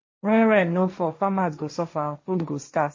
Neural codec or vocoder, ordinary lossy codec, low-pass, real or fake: codec, 16 kHz, 1.1 kbps, Voila-Tokenizer; MP3, 32 kbps; 7.2 kHz; fake